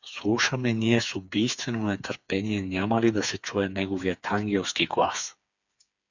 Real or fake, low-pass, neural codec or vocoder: fake; 7.2 kHz; codec, 16 kHz, 4 kbps, FreqCodec, smaller model